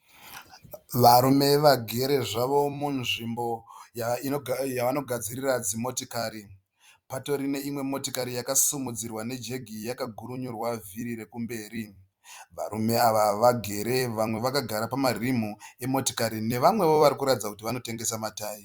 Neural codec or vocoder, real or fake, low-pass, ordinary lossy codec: vocoder, 44.1 kHz, 128 mel bands every 256 samples, BigVGAN v2; fake; 19.8 kHz; Opus, 64 kbps